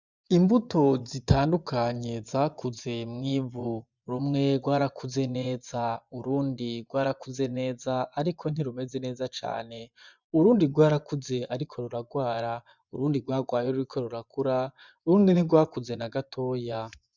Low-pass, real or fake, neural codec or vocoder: 7.2 kHz; fake; vocoder, 24 kHz, 100 mel bands, Vocos